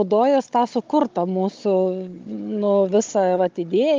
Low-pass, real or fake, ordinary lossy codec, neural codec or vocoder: 7.2 kHz; fake; Opus, 32 kbps; codec, 16 kHz, 16 kbps, FunCodec, trained on Chinese and English, 50 frames a second